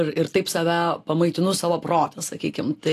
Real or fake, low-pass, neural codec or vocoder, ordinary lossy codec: real; 14.4 kHz; none; AAC, 64 kbps